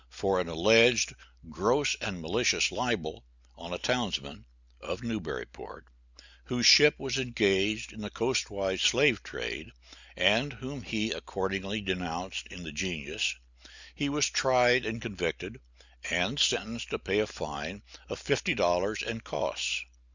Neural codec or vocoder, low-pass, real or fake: none; 7.2 kHz; real